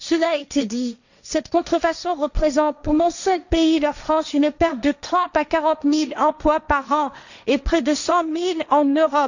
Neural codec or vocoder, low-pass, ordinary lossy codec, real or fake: codec, 16 kHz, 1.1 kbps, Voila-Tokenizer; 7.2 kHz; none; fake